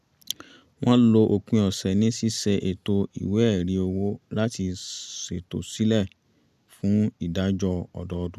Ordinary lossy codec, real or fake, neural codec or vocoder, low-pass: none; real; none; 14.4 kHz